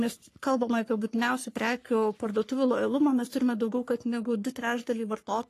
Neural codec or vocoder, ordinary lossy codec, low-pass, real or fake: codec, 44.1 kHz, 3.4 kbps, Pupu-Codec; AAC, 48 kbps; 14.4 kHz; fake